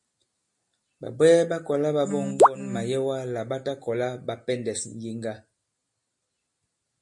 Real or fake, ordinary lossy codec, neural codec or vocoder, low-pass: real; MP3, 48 kbps; none; 10.8 kHz